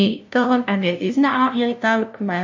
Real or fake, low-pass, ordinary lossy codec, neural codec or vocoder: fake; 7.2 kHz; MP3, 48 kbps; codec, 16 kHz, 0.5 kbps, FunCodec, trained on LibriTTS, 25 frames a second